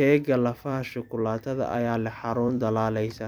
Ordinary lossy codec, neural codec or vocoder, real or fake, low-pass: none; vocoder, 44.1 kHz, 128 mel bands every 256 samples, BigVGAN v2; fake; none